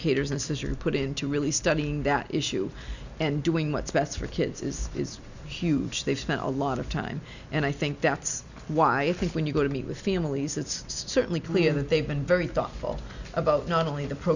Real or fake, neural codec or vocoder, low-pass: real; none; 7.2 kHz